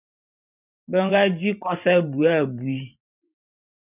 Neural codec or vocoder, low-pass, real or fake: vocoder, 44.1 kHz, 128 mel bands every 512 samples, BigVGAN v2; 3.6 kHz; fake